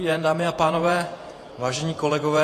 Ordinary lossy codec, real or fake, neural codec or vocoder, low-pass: AAC, 48 kbps; fake; vocoder, 48 kHz, 128 mel bands, Vocos; 14.4 kHz